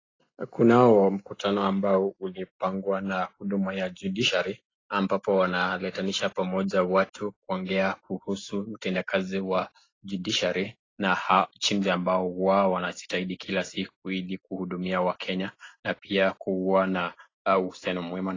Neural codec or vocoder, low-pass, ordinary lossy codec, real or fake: none; 7.2 kHz; AAC, 32 kbps; real